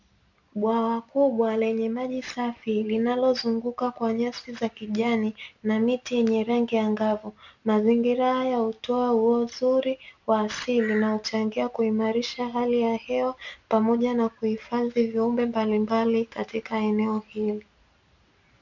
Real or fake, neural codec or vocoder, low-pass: real; none; 7.2 kHz